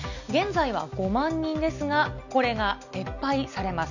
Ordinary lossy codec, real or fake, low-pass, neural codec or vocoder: none; real; 7.2 kHz; none